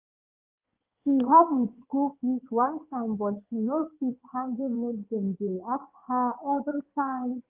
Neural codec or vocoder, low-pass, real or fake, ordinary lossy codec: codec, 16 kHz in and 24 kHz out, 2.2 kbps, FireRedTTS-2 codec; 3.6 kHz; fake; Opus, 32 kbps